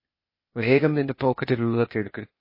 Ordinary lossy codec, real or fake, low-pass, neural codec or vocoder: MP3, 24 kbps; fake; 5.4 kHz; codec, 16 kHz, 0.8 kbps, ZipCodec